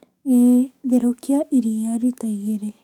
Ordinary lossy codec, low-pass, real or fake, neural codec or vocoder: none; 19.8 kHz; fake; codec, 44.1 kHz, 7.8 kbps, DAC